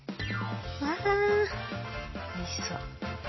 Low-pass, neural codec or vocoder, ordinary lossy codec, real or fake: 7.2 kHz; none; MP3, 24 kbps; real